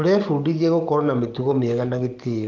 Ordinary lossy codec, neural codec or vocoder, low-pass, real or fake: Opus, 32 kbps; codec, 44.1 kHz, 7.8 kbps, DAC; 7.2 kHz; fake